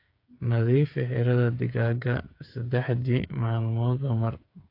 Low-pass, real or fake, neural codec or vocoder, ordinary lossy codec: 5.4 kHz; fake; codec, 16 kHz, 8 kbps, FreqCodec, smaller model; AAC, 32 kbps